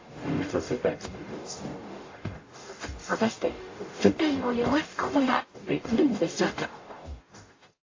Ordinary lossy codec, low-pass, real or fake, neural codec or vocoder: AAC, 32 kbps; 7.2 kHz; fake; codec, 44.1 kHz, 0.9 kbps, DAC